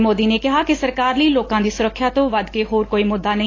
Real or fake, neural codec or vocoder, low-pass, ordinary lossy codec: real; none; 7.2 kHz; AAC, 32 kbps